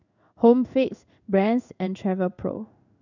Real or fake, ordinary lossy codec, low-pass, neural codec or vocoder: fake; none; 7.2 kHz; codec, 16 kHz in and 24 kHz out, 1 kbps, XY-Tokenizer